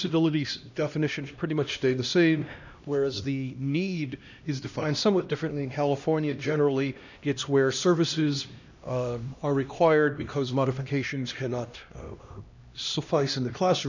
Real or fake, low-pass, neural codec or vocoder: fake; 7.2 kHz; codec, 16 kHz, 1 kbps, X-Codec, HuBERT features, trained on LibriSpeech